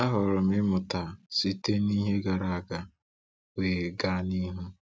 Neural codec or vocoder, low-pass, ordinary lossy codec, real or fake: none; none; none; real